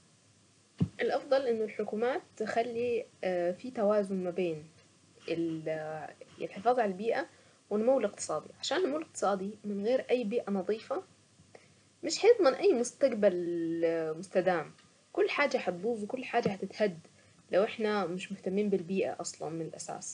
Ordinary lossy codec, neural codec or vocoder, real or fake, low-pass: none; none; real; 9.9 kHz